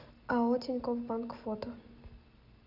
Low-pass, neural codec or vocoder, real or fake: 5.4 kHz; none; real